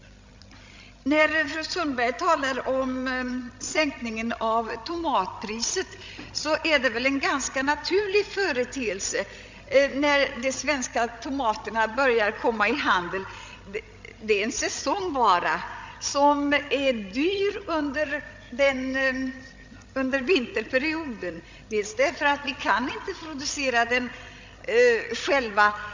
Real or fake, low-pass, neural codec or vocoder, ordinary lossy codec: fake; 7.2 kHz; codec, 16 kHz, 16 kbps, FreqCodec, larger model; MP3, 64 kbps